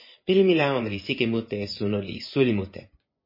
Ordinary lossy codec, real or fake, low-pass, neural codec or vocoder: MP3, 24 kbps; fake; 5.4 kHz; vocoder, 24 kHz, 100 mel bands, Vocos